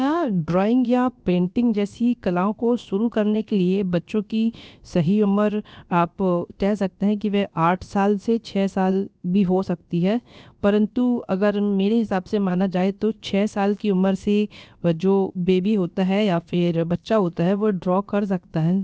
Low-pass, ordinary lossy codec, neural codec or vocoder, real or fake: none; none; codec, 16 kHz, about 1 kbps, DyCAST, with the encoder's durations; fake